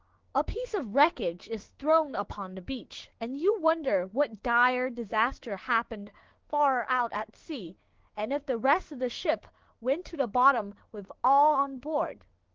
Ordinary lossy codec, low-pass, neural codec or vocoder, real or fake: Opus, 16 kbps; 7.2 kHz; codec, 16 kHz, 6 kbps, DAC; fake